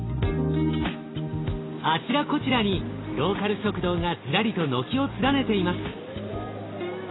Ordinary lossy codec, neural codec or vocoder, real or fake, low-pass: AAC, 16 kbps; none; real; 7.2 kHz